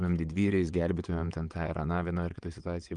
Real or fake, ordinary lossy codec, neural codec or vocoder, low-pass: fake; Opus, 32 kbps; vocoder, 22.05 kHz, 80 mel bands, WaveNeXt; 9.9 kHz